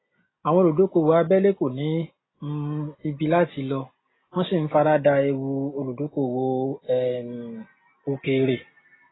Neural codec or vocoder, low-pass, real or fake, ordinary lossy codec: none; 7.2 kHz; real; AAC, 16 kbps